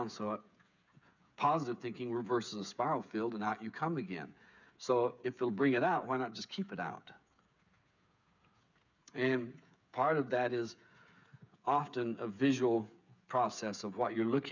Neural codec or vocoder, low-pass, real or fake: codec, 16 kHz, 8 kbps, FreqCodec, smaller model; 7.2 kHz; fake